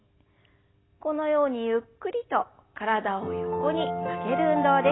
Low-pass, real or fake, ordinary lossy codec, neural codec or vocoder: 7.2 kHz; real; AAC, 16 kbps; none